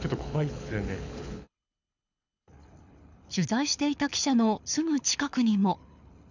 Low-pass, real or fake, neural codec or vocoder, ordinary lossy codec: 7.2 kHz; fake; codec, 24 kHz, 6 kbps, HILCodec; none